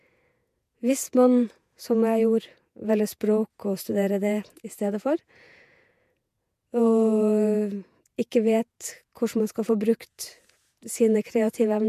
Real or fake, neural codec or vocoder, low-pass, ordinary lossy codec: fake; vocoder, 48 kHz, 128 mel bands, Vocos; 14.4 kHz; MP3, 96 kbps